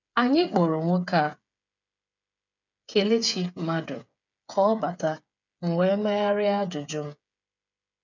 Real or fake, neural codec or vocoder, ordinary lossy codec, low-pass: fake; codec, 16 kHz, 8 kbps, FreqCodec, smaller model; none; 7.2 kHz